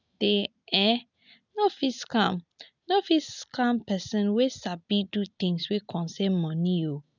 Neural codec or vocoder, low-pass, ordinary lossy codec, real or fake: none; 7.2 kHz; none; real